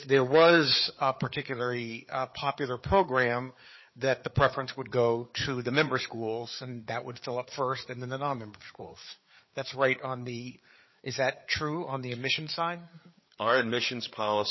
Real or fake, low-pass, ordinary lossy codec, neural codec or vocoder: fake; 7.2 kHz; MP3, 24 kbps; codec, 16 kHz, 4 kbps, FreqCodec, larger model